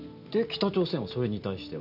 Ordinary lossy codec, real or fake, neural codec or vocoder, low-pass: none; real; none; 5.4 kHz